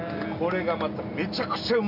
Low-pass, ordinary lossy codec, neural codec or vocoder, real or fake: 5.4 kHz; none; none; real